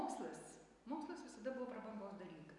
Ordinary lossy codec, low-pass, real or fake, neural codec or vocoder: MP3, 96 kbps; 10.8 kHz; real; none